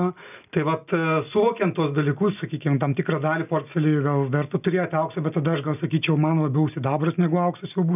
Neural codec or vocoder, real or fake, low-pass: none; real; 3.6 kHz